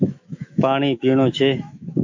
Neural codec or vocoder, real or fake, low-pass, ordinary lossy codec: autoencoder, 48 kHz, 128 numbers a frame, DAC-VAE, trained on Japanese speech; fake; 7.2 kHz; AAC, 48 kbps